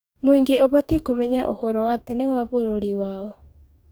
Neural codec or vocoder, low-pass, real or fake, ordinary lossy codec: codec, 44.1 kHz, 2.6 kbps, DAC; none; fake; none